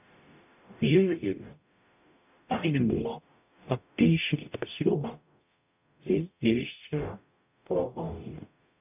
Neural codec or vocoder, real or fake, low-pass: codec, 44.1 kHz, 0.9 kbps, DAC; fake; 3.6 kHz